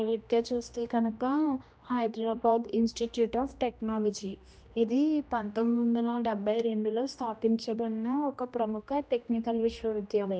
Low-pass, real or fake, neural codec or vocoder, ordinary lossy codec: none; fake; codec, 16 kHz, 1 kbps, X-Codec, HuBERT features, trained on general audio; none